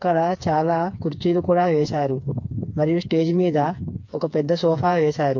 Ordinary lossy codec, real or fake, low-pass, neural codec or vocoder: MP3, 48 kbps; fake; 7.2 kHz; codec, 16 kHz, 4 kbps, FreqCodec, smaller model